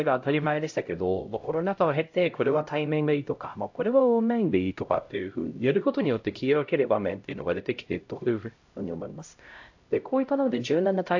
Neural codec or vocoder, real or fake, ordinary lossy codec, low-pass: codec, 16 kHz, 0.5 kbps, X-Codec, HuBERT features, trained on LibriSpeech; fake; AAC, 48 kbps; 7.2 kHz